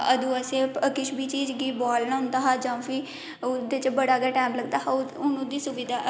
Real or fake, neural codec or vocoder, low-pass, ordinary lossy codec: real; none; none; none